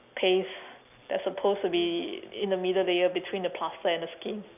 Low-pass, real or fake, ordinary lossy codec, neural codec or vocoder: 3.6 kHz; real; none; none